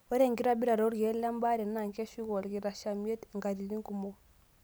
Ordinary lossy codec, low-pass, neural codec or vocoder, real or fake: none; none; none; real